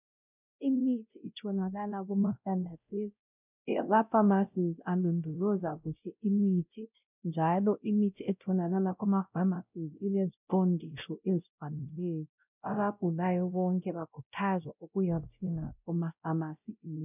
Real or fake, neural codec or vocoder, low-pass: fake; codec, 16 kHz, 0.5 kbps, X-Codec, WavLM features, trained on Multilingual LibriSpeech; 3.6 kHz